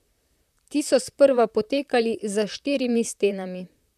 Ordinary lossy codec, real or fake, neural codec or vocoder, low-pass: none; fake; vocoder, 44.1 kHz, 128 mel bands, Pupu-Vocoder; 14.4 kHz